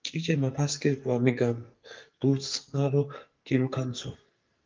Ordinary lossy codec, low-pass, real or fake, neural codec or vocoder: Opus, 24 kbps; 7.2 kHz; fake; codec, 44.1 kHz, 2.6 kbps, SNAC